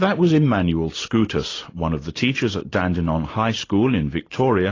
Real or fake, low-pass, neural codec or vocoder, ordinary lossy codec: real; 7.2 kHz; none; AAC, 32 kbps